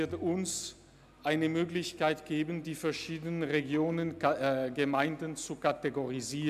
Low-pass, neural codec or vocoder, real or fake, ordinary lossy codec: 14.4 kHz; none; real; none